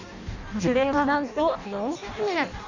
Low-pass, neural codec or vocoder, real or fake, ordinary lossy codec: 7.2 kHz; codec, 16 kHz in and 24 kHz out, 0.6 kbps, FireRedTTS-2 codec; fake; none